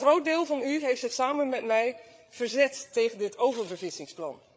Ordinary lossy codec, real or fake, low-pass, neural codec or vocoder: none; fake; none; codec, 16 kHz, 8 kbps, FreqCodec, larger model